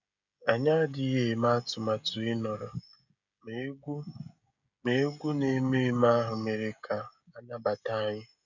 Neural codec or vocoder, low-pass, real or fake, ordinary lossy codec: codec, 16 kHz, 16 kbps, FreqCodec, smaller model; 7.2 kHz; fake; none